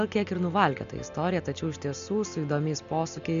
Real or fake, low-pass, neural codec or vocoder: real; 7.2 kHz; none